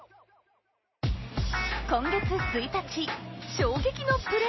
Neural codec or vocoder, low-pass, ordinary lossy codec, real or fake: none; 7.2 kHz; MP3, 24 kbps; real